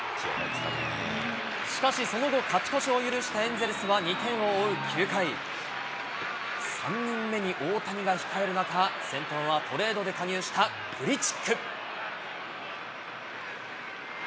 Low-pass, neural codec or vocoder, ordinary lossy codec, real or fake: none; none; none; real